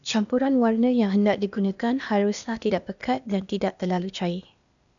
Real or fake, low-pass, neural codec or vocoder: fake; 7.2 kHz; codec, 16 kHz, 0.8 kbps, ZipCodec